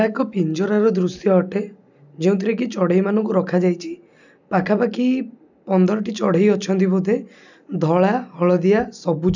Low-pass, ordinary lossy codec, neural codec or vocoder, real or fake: 7.2 kHz; none; none; real